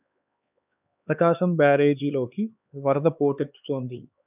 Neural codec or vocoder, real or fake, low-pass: codec, 16 kHz, 4 kbps, X-Codec, HuBERT features, trained on LibriSpeech; fake; 3.6 kHz